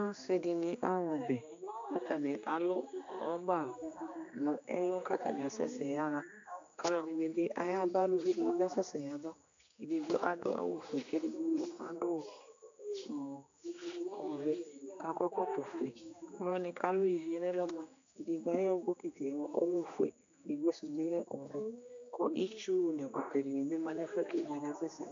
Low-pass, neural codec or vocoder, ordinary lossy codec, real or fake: 7.2 kHz; codec, 16 kHz, 2 kbps, X-Codec, HuBERT features, trained on general audio; AAC, 64 kbps; fake